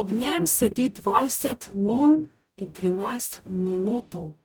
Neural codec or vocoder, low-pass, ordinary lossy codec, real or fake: codec, 44.1 kHz, 0.9 kbps, DAC; none; none; fake